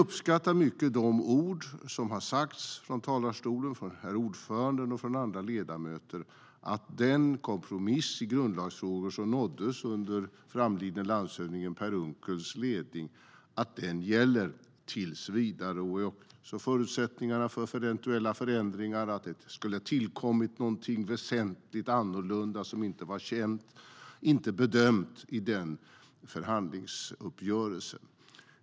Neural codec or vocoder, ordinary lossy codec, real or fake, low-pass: none; none; real; none